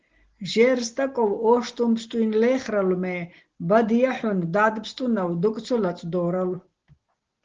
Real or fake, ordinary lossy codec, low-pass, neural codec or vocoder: real; Opus, 16 kbps; 7.2 kHz; none